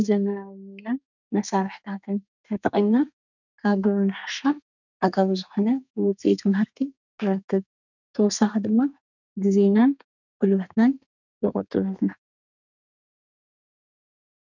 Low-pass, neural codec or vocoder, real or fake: 7.2 kHz; codec, 32 kHz, 1.9 kbps, SNAC; fake